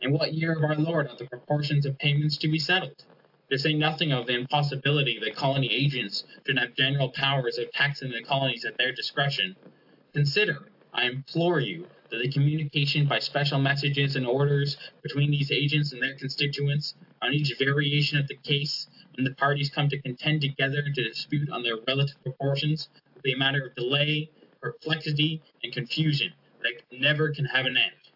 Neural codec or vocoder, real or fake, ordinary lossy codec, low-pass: none; real; AAC, 48 kbps; 5.4 kHz